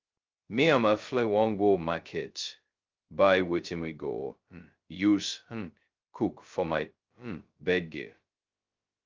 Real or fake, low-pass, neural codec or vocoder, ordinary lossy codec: fake; 7.2 kHz; codec, 16 kHz, 0.2 kbps, FocalCodec; Opus, 32 kbps